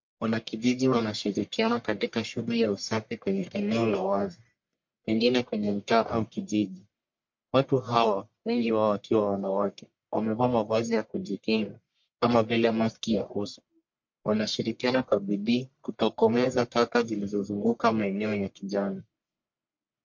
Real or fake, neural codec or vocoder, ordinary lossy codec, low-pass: fake; codec, 44.1 kHz, 1.7 kbps, Pupu-Codec; MP3, 48 kbps; 7.2 kHz